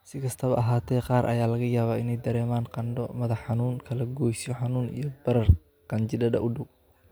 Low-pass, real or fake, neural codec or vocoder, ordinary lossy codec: none; real; none; none